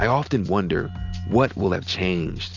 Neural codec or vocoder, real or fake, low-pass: none; real; 7.2 kHz